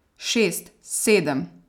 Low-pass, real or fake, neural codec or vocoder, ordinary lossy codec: 19.8 kHz; real; none; none